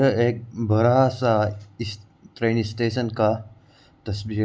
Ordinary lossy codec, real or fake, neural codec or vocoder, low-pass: none; real; none; none